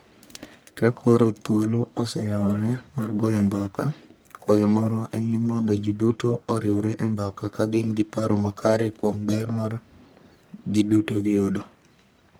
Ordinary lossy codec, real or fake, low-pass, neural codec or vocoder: none; fake; none; codec, 44.1 kHz, 1.7 kbps, Pupu-Codec